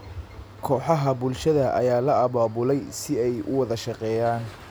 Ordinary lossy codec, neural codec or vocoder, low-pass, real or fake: none; none; none; real